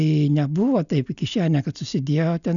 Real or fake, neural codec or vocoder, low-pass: real; none; 7.2 kHz